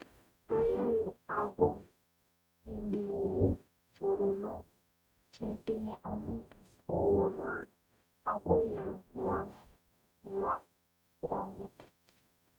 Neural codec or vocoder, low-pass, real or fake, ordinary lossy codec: codec, 44.1 kHz, 0.9 kbps, DAC; 19.8 kHz; fake; none